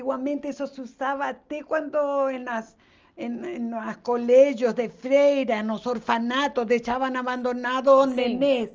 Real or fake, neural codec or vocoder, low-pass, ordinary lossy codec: real; none; 7.2 kHz; Opus, 24 kbps